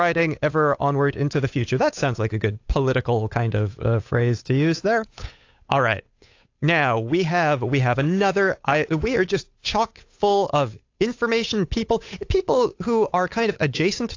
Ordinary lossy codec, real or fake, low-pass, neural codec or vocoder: AAC, 48 kbps; fake; 7.2 kHz; codec, 16 kHz, 8 kbps, FunCodec, trained on Chinese and English, 25 frames a second